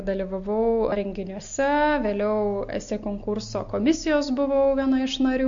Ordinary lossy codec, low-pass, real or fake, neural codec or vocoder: MP3, 48 kbps; 7.2 kHz; real; none